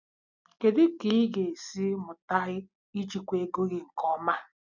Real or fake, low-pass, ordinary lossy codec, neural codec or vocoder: real; 7.2 kHz; none; none